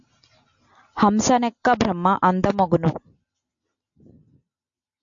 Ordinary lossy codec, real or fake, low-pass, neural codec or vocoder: AAC, 64 kbps; real; 7.2 kHz; none